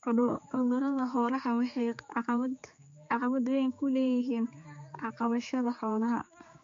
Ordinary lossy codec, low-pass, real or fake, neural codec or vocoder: MP3, 48 kbps; 7.2 kHz; fake; codec, 16 kHz, 4 kbps, X-Codec, HuBERT features, trained on general audio